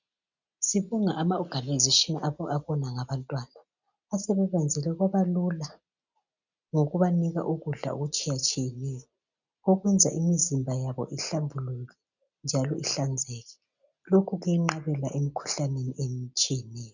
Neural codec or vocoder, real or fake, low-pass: none; real; 7.2 kHz